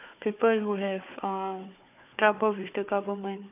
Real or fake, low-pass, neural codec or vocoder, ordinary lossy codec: fake; 3.6 kHz; codec, 16 kHz, 4 kbps, FunCodec, trained on Chinese and English, 50 frames a second; none